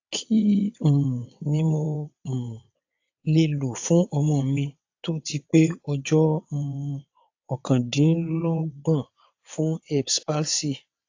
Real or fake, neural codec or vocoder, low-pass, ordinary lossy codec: fake; vocoder, 22.05 kHz, 80 mel bands, WaveNeXt; 7.2 kHz; AAC, 48 kbps